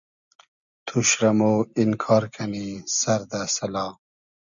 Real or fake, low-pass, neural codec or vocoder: real; 7.2 kHz; none